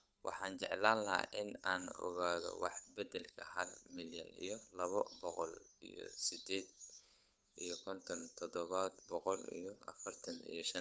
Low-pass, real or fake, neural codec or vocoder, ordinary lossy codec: none; fake; codec, 16 kHz, 8 kbps, FunCodec, trained on Chinese and English, 25 frames a second; none